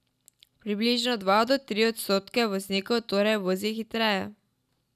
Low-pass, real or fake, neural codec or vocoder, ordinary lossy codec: 14.4 kHz; real; none; none